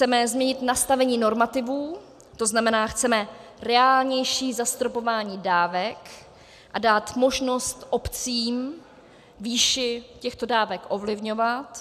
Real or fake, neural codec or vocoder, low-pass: real; none; 14.4 kHz